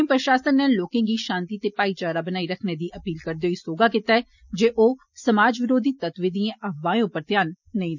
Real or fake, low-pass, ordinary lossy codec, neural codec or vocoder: real; 7.2 kHz; none; none